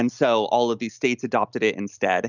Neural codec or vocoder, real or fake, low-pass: none; real; 7.2 kHz